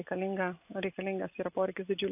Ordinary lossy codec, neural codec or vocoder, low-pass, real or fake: AAC, 32 kbps; none; 3.6 kHz; real